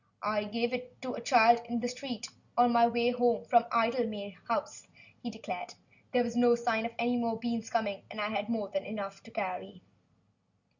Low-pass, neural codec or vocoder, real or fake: 7.2 kHz; none; real